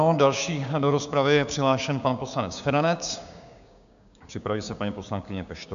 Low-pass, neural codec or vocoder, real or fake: 7.2 kHz; codec, 16 kHz, 6 kbps, DAC; fake